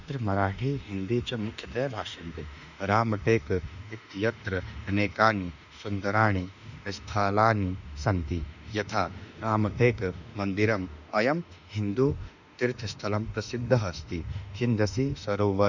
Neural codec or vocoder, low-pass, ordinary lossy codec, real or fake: autoencoder, 48 kHz, 32 numbers a frame, DAC-VAE, trained on Japanese speech; 7.2 kHz; none; fake